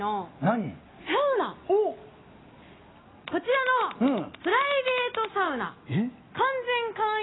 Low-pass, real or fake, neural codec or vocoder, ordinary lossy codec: 7.2 kHz; real; none; AAC, 16 kbps